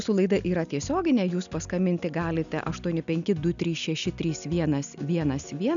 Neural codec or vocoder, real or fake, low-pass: none; real; 7.2 kHz